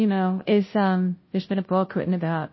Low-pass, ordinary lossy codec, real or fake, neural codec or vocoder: 7.2 kHz; MP3, 24 kbps; fake; codec, 16 kHz, 0.5 kbps, FunCodec, trained on Chinese and English, 25 frames a second